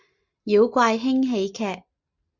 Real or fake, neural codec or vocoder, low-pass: real; none; 7.2 kHz